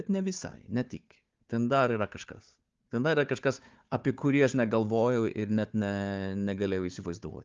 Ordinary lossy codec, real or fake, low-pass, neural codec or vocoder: Opus, 32 kbps; fake; 7.2 kHz; codec, 16 kHz, 4 kbps, FunCodec, trained on Chinese and English, 50 frames a second